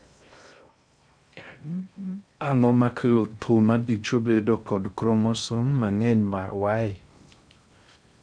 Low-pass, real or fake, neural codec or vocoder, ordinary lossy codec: 9.9 kHz; fake; codec, 16 kHz in and 24 kHz out, 0.6 kbps, FocalCodec, streaming, 2048 codes; none